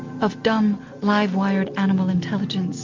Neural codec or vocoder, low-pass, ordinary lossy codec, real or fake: none; 7.2 kHz; MP3, 48 kbps; real